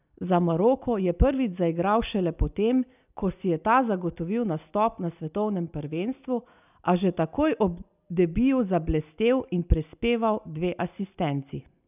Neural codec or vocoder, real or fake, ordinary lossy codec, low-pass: none; real; none; 3.6 kHz